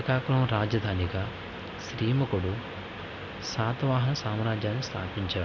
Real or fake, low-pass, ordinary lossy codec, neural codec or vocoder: real; 7.2 kHz; none; none